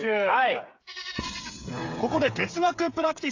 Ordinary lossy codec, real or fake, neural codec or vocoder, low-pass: AAC, 48 kbps; fake; codec, 16 kHz, 8 kbps, FreqCodec, smaller model; 7.2 kHz